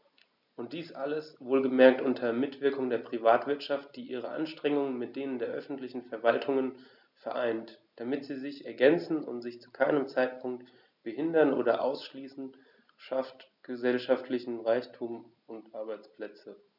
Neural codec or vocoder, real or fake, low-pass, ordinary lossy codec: none; real; 5.4 kHz; none